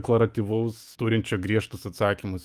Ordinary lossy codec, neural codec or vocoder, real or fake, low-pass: Opus, 32 kbps; autoencoder, 48 kHz, 128 numbers a frame, DAC-VAE, trained on Japanese speech; fake; 14.4 kHz